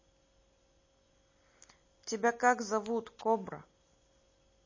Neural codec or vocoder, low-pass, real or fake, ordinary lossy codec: none; 7.2 kHz; real; MP3, 32 kbps